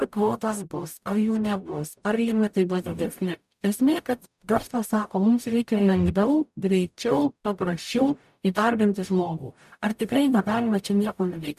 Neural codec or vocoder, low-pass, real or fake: codec, 44.1 kHz, 0.9 kbps, DAC; 14.4 kHz; fake